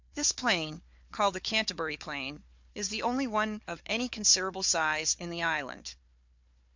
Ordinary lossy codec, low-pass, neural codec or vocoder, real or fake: MP3, 64 kbps; 7.2 kHz; codec, 16 kHz, 4 kbps, FunCodec, trained on Chinese and English, 50 frames a second; fake